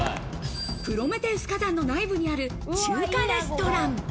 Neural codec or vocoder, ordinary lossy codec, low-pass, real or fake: none; none; none; real